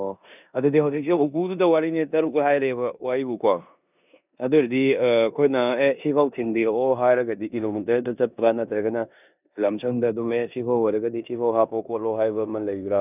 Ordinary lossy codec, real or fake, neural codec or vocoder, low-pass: none; fake; codec, 16 kHz in and 24 kHz out, 0.9 kbps, LongCat-Audio-Codec, four codebook decoder; 3.6 kHz